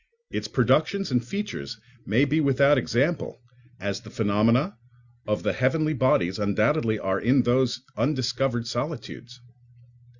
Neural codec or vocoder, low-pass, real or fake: none; 7.2 kHz; real